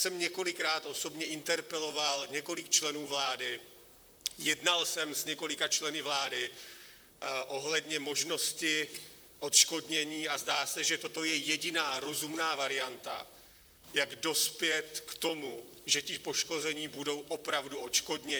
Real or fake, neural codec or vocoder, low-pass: fake; vocoder, 44.1 kHz, 128 mel bands, Pupu-Vocoder; 19.8 kHz